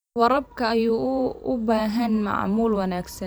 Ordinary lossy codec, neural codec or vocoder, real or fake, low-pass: none; vocoder, 44.1 kHz, 128 mel bands every 512 samples, BigVGAN v2; fake; none